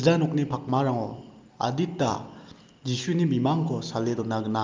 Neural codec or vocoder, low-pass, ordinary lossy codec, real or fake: none; 7.2 kHz; Opus, 32 kbps; real